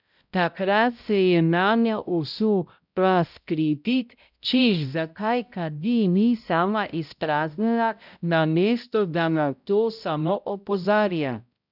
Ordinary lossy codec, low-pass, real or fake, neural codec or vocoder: none; 5.4 kHz; fake; codec, 16 kHz, 0.5 kbps, X-Codec, HuBERT features, trained on balanced general audio